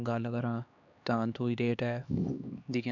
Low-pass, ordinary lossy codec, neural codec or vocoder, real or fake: 7.2 kHz; none; codec, 16 kHz, 2 kbps, X-Codec, HuBERT features, trained on LibriSpeech; fake